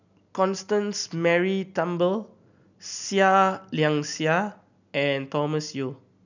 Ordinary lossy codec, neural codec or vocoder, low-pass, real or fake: none; none; 7.2 kHz; real